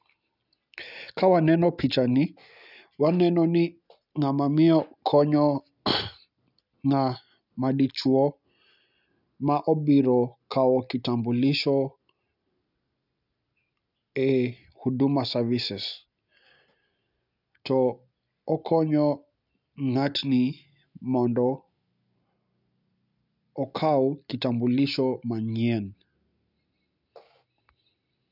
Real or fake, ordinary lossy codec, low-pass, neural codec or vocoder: real; none; 5.4 kHz; none